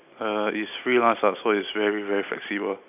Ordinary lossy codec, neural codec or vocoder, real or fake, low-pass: none; none; real; 3.6 kHz